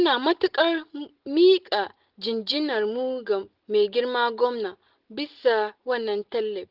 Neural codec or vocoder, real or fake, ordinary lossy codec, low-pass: none; real; Opus, 16 kbps; 5.4 kHz